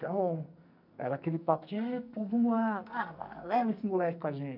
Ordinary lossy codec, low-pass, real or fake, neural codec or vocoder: none; 5.4 kHz; fake; codec, 44.1 kHz, 2.6 kbps, SNAC